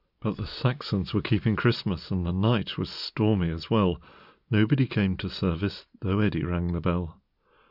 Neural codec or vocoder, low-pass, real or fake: none; 5.4 kHz; real